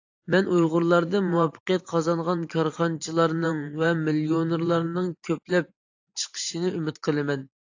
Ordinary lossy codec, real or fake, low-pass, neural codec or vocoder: AAC, 48 kbps; fake; 7.2 kHz; vocoder, 44.1 kHz, 128 mel bands every 512 samples, BigVGAN v2